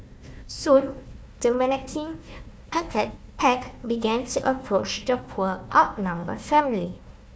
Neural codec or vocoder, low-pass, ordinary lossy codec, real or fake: codec, 16 kHz, 1 kbps, FunCodec, trained on Chinese and English, 50 frames a second; none; none; fake